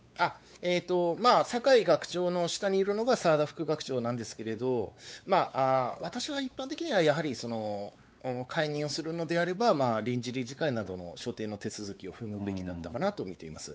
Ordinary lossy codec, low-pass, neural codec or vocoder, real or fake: none; none; codec, 16 kHz, 4 kbps, X-Codec, WavLM features, trained on Multilingual LibriSpeech; fake